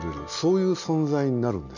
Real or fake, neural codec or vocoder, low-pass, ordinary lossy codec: real; none; 7.2 kHz; none